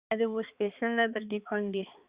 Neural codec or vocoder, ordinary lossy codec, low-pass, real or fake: codec, 16 kHz, 4 kbps, X-Codec, HuBERT features, trained on balanced general audio; Opus, 64 kbps; 3.6 kHz; fake